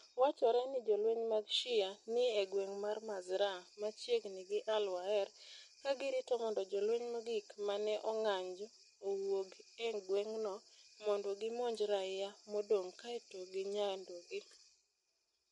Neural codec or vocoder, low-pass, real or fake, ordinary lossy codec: none; 19.8 kHz; real; MP3, 48 kbps